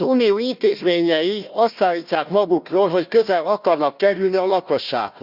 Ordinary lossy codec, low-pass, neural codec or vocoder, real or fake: Opus, 64 kbps; 5.4 kHz; codec, 16 kHz, 1 kbps, FunCodec, trained on Chinese and English, 50 frames a second; fake